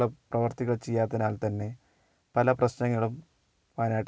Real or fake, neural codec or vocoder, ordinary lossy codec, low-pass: real; none; none; none